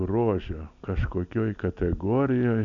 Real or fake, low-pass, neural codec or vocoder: real; 7.2 kHz; none